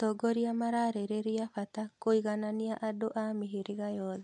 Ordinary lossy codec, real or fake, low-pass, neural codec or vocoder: MP3, 64 kbps; real; 10.8 kHz; none